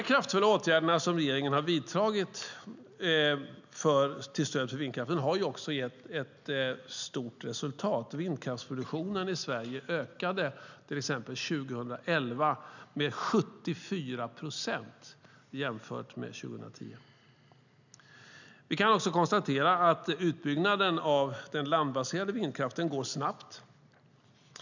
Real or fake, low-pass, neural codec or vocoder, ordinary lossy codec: real; 7.2 kHz; none; none